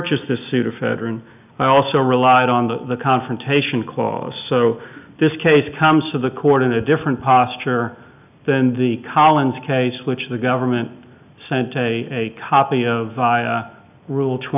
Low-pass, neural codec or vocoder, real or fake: 3.6 kHz; none; real